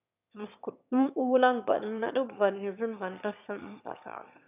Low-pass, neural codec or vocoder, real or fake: 3.6 kHz; autoencoder, 22.05 kHz, a latent of 192 numbers a frame, VITS, trained on one speaker; fake